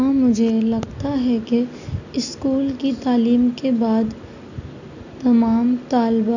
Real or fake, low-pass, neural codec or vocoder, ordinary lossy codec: real; 7.2 kHz; none; none